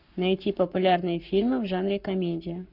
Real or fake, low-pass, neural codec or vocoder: fake; 5.4 kHz; codec, 44.1 kHz, 7.8 kbps, Pupu-Codec